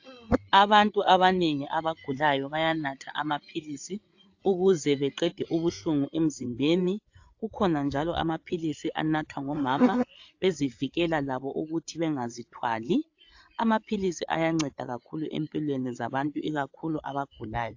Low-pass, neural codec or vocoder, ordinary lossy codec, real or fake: 7.2 kHz; codec, 16 kHz, 8 kbps, FreqCodec, larger model; AAC, 48 kbps; fake